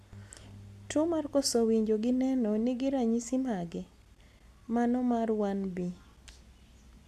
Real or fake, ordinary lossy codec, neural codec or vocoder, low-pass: real; none; none; 14.4 kHz